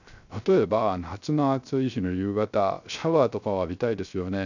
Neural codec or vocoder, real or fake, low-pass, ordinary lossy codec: codec, 16 kHz, 0.3 kbps, FocalCodec; fake; 7.2 kHz; none